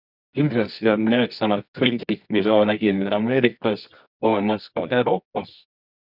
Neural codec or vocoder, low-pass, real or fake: codec, 24 kHz, 0.9 kbps, WavTokenizer, medium music audio release; 5.4 kHz; fake